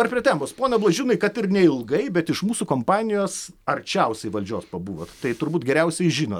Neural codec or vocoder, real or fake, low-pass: none; real; 19.8 kHz